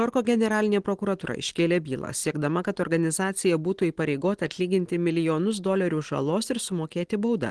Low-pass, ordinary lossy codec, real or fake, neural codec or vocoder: 10.8 kHz; Opus, 16 kbps; real; none